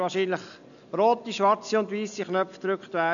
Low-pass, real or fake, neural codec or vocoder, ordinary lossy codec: 7.2 kHz; real; none; none